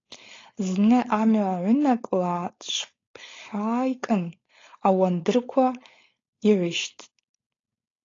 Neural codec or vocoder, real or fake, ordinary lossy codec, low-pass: codec, 16 kHz, 4.8 kbps, FACodec; fake; AAC, 32 kbps; 7.2 kHz